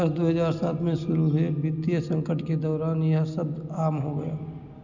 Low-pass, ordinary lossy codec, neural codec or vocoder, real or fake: 7.2 kHz; none; none; real